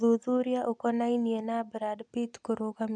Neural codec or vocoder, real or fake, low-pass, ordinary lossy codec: none; real; 9.9 kHz; none